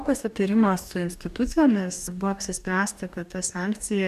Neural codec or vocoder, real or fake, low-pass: codec, 44.1 kHz, 2.6 kbps, DAC; fake; 14.4 kHz